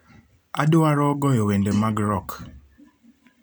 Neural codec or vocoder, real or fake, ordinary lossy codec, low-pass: vocoder, 44.1 kHz, 128 mel bands every 256 samples, BigVGAN v2; fake; none; none